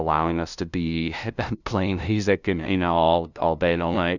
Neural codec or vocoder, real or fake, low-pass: codec, 16 kHz, 0.5 kbps, FunCodec, trained on LibriTTS, 25 frames a second; fake; 7.2 kHz